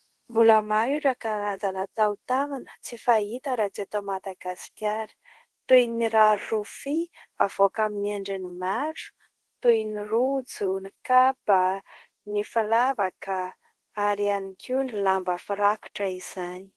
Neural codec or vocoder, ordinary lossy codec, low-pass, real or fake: codec, 24 kHz, 0.5 kbps, DualCodec; Opus, 16 kbps; 10.8 kHz; fake